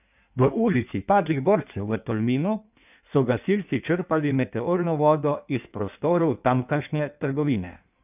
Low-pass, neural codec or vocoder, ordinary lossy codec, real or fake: 3.6 kHz; codec, 16 kHz in and 24 kHz out, 1.1 kbps, FireRedTTS-2 codec; none; fake